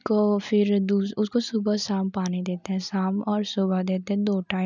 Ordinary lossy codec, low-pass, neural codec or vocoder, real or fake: none; 7.2 kHz; none; real